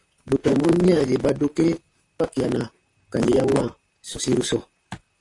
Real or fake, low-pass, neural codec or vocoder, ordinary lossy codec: fake; 10.8 kHz; vocoder, 44.1 kHz, 128 mel bands, Pupu-Vocoder; AAC, 48 kbps